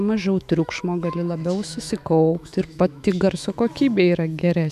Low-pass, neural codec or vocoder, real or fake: 14.4 kHz; autoencoder, 48 kHz, 128 numbers a frame, DAC-VAE, trained on Japanese speech; fake